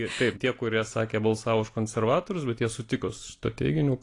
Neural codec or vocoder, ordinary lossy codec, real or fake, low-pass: none; AAC, 48 kbps; real; 10.8 kHz